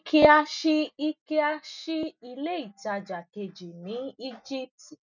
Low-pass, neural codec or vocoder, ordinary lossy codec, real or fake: 7.2 kHz; none; none; real